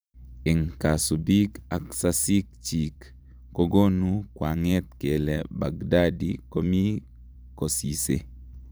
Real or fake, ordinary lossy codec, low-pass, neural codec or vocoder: fake; none; none; vocoder, 44.1 kHz, 128 mel bands every 512 samples, BigVGAN v2